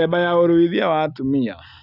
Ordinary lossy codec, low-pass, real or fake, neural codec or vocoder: none; 5.4 kHz; real; none